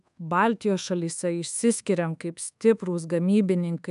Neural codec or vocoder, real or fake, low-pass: codec, 24 kHz, 1.2 kbps, DualCodec; fake; 10.8 kHz